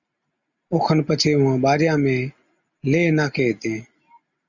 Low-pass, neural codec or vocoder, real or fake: 7.2 kHz; none; real